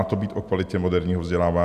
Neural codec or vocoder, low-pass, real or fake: none; 14.4 kHz; real